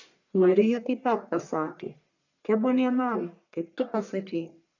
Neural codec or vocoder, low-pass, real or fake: codec, 44.1 kHz, 1.7 kbps, Pupu-Codec; 7.2 kHz; fake